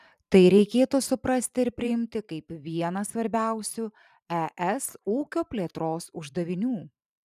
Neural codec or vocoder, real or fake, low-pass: vocoder, 44.1 kHz, 128 mel bands every 512 samples, BigVGAN v2; fake; 14.4 kHz